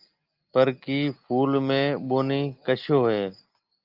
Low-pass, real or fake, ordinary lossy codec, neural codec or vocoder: 5.4 kHz; real; Opus, 24 kbps; none